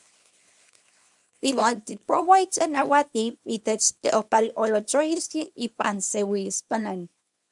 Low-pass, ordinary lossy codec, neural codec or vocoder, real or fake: 10.8 kHz; MP3, 96 kbps; codec, 24 kHz, 0.9 kbps, WavTokenizer, small release; fake